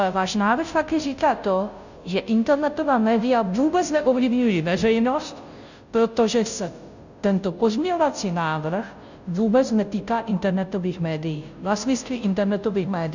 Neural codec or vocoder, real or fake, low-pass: codec, 16 kHz, 0.5 kbps, FunCodec, trained on Chinese and English, 25 frames a second; fake; 7.2 kHz